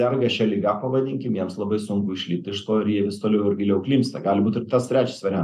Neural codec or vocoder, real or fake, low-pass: none; real; 14.4 kHz